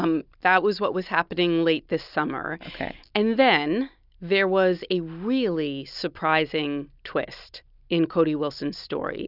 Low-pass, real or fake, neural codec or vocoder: 5.4 kHz; real; none